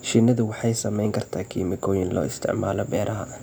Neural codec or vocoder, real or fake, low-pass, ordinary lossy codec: none; real; none; none